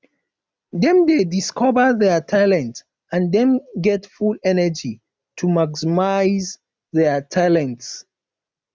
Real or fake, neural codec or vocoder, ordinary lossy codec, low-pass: real; none; none; none